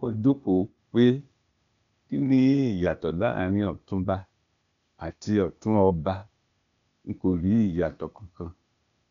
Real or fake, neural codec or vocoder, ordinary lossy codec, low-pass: fake; codec, 16 kHz, 0.8 kbps, ZipCodec; none; 7.2 kHz